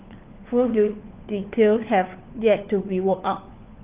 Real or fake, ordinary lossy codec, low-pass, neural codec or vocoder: fake; Opus, 32 kbps; 3.6 kHz; codec, 16 kHz, 4 kbps, FunCodec, trained on LibriTTS, 50 frames a second